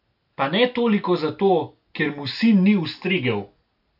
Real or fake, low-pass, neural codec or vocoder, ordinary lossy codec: real; 5.4 kHz; none; none